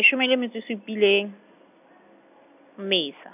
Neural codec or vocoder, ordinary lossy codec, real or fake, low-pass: none; none; real; 3.6 kHz